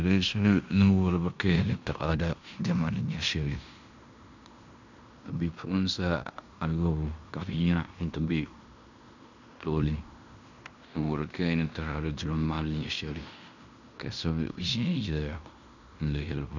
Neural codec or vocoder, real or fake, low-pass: codec, 16 kHz in and 24 kHz out, 0.9 kbps, LongCat-Audio-Codec, fine tuned four codebook decoder; fake; 7.2 kHz